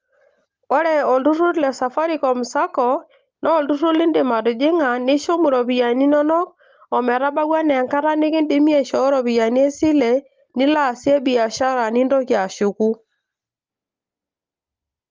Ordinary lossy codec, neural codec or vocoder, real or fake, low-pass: Opus, 24 kbps; none; real; 7.2 kHz